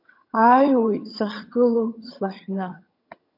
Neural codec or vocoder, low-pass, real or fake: vocoder, 22.05 kHz, 80 mel bands, HiFi-GAN; 5.4 kHz; fake